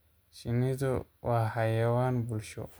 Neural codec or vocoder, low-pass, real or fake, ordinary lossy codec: none; none; real; none